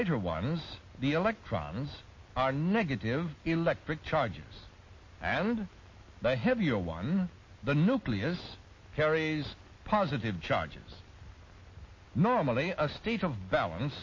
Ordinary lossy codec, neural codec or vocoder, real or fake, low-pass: MP3, 32 kbps; none; real; 7.2 kHz